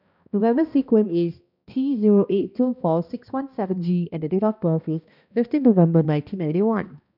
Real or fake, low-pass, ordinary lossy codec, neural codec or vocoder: fake; 5.4 kHz; none; codec, 16 kHz, 1 kbps, X-Codec, HuBERT features, trained on balanced general audio